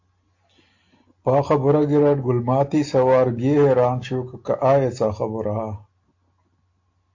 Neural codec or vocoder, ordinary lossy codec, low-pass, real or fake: none; AAC, 48 kbps; 7.2 kHz; real